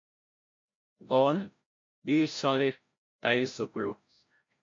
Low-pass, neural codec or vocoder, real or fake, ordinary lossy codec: 7.2 kHz; codec, 16 kHz, 0.5 kbps, FreqCodec, larger model; fake; MP3, 48 kbps